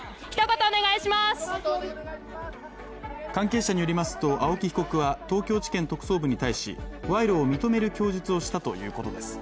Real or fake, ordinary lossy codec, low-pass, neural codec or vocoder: real; none; none; none